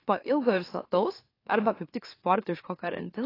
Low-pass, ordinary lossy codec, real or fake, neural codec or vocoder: 5.4 kHz; AAC, 24 kbps; fake; autoencoder, 44.1 kHz, a latent of 192 numbers a frame, MeloTTS